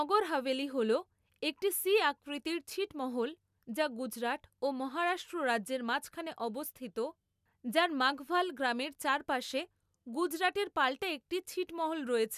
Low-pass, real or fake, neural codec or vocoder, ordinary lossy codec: 14.4 kHz; real; none; none